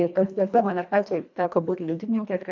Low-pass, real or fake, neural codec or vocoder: 7.2 kHz; fake; codec, 24 kHz, 1.5 kbps, HILCodec